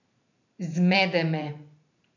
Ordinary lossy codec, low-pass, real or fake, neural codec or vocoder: none; 7.2 kHz; fake; vocoder, 44.1 kHz, 128 mel bands every 512 samples, BigVGAN v2